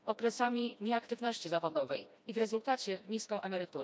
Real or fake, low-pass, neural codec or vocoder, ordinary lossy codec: fake; none; codec, 16 kHz, 1 kbps, FreqCodec, smaller model; none